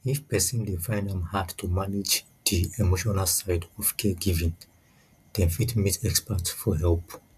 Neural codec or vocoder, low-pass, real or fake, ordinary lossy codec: none; 14.4 kHz; real; none